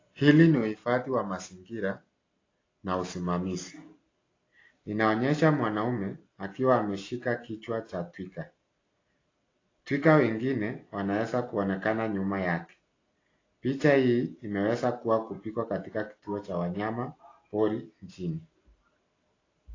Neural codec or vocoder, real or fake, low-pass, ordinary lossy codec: none; real; 7.2 kHz; AAC, 32 kbps